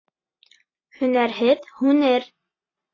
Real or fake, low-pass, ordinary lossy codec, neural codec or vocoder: real; 7.2 kHz; AAC, 32 kbps; none